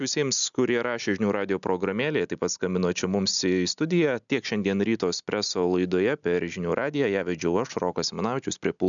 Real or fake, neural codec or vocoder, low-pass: real; none; 7.2 kHz